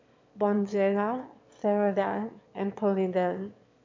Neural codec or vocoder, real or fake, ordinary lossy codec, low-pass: autoencoder, 22.05 kHz, a latent of 192 numbers a frame, VITS, trained on one speaker; fake; MP3, 64 kbps; 7.2 kHz